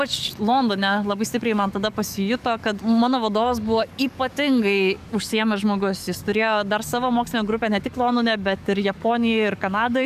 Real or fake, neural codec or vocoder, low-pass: fake; codec, 44.1 kHz, 7.8 kbps, DAC; 14.4 kHz